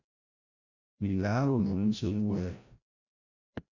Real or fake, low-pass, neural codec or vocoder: fake; 7.2 kHz; codec, 16 kHz, 0.5 kbps, FreqCodec, larger model